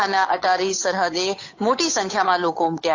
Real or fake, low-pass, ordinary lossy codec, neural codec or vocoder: fake; 7.2 kHz; AAC, 48 kbps; codec, 16 kHz, 8 kbps, FunCodec, trained on Chinese and English, 25 frames a second